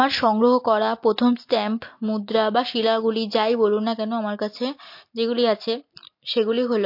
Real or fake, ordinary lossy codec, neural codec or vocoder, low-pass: real; MP3, 32 kbps; none; 5.4 kHz